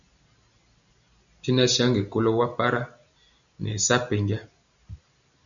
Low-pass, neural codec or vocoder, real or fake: 7.2 kHz; none; real